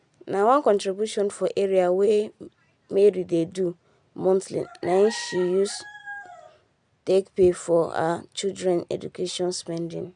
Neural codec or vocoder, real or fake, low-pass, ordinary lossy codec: none; real; 9.9 kHz; MP3, 96 kbps